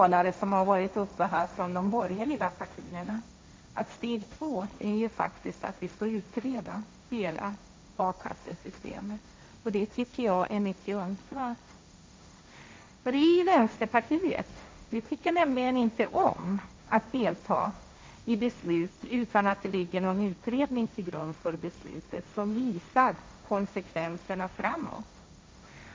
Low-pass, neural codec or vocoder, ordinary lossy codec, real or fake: none; codec, 16 kHz, 1.1 kbps, Voila-Tokenizer; none; fake